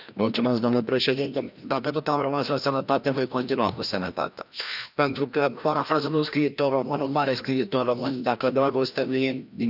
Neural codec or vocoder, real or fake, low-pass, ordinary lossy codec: codec, 16 kHz, 1 kbps, FreqCodec, larger model; fake; 5.4 kHz; none